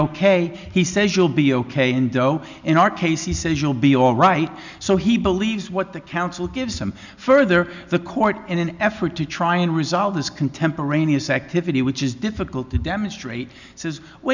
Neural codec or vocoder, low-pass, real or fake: none; 7.2 kHz; real